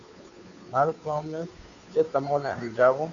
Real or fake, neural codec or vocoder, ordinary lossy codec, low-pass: fake; codec, 16 kHz, 2 kbps, FunCodec, trained on Chinese and English, 25 frames a second; MP3, 96 kbps; 7.2 kHz